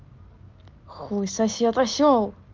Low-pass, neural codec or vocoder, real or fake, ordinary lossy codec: 7.2 kHz; none; real; Opus, 32 kbps